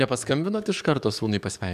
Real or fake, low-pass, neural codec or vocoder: fake; 14.4 kHz; codec, 44.1 kHz, 7.8 kbps, DAC